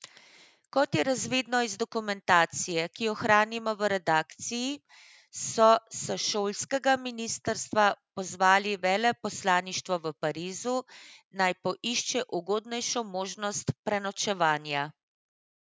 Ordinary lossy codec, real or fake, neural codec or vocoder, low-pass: none; real; none; none